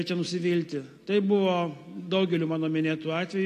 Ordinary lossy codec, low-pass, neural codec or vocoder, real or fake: AAC, 48 kbps; 14.4 kHz; none; real